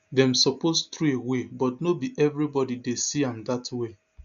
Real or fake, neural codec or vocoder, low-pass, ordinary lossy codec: real; none; 7.2 kHz; none